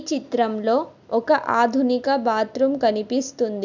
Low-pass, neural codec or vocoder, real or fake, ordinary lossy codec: 7.2 kHz; none; real; none